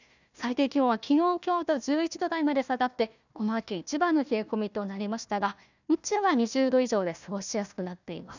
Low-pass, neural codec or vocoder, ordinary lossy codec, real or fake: 7.2 kHz; codec, 16 kHz, 1 kbps, FunCodec, trained on Chinese and English, 50 frames a second; none; fake